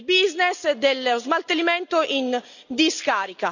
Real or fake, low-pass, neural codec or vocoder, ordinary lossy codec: real; 7.2 kHz; none; none